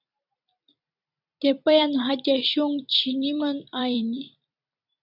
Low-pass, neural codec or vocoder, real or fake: 5.4 kHz; vocoder, 44.1 kHz, 128 mel bands every 256 samples, BigVGAN v2; fake